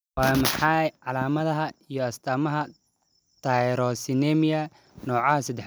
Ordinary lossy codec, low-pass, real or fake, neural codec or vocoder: none; none; real; none